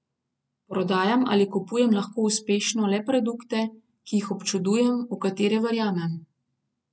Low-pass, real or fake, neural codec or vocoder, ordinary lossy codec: none; real; none; none